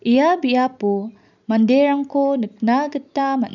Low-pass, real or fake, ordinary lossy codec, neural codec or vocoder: 7.2 kHz; real; none; none